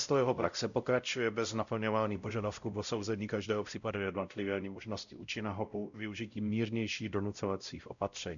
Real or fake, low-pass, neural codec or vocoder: fake; 7.2 kHz; codec, 16 kHz, 0.5 kbps, X-Codec, WavLM features, trained on Multilingual LibriSpeech